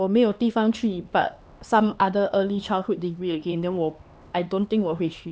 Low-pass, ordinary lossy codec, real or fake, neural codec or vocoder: none; none; fake; codec, 16 kHz, 2 kbps, X-Codec, HuBERT features, trained on LibriSpeech